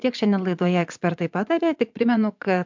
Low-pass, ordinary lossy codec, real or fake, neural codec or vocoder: 7.2 kHz; MP3, 64 kbps; fake; vocoder, 22.05 kHz, 80 mel bands, Vocos